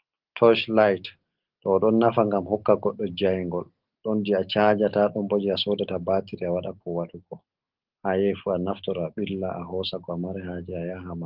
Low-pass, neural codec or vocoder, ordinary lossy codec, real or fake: 5.4 kHz; none; Opus, 16 kbps; real